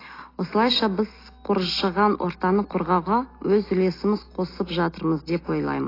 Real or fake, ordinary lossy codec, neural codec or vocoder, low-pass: real; AAC, 24 kbps; none; 5.4 kHz